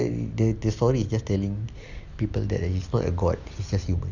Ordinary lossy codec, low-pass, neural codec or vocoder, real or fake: none; 7.2 kHz; none; real